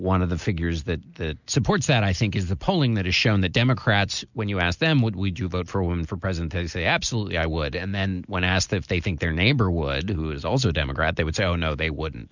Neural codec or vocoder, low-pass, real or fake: none; 7.2 kHz; real